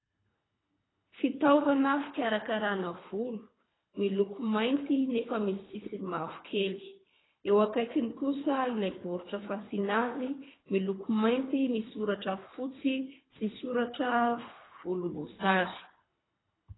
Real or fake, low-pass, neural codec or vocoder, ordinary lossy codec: fake; 7.2 kHz; codec, 24 kHz, 3 kbps, HILCodec; AAC, 16 kbps